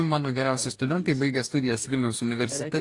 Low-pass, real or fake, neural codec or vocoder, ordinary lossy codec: 10.8 kHz; fake; codec, 44.1 kHz, 2.6 kbps, DAC; AAC, 48 kbps